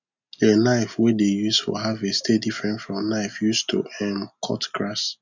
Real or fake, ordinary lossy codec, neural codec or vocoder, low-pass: real; none; none; 7.2 kHz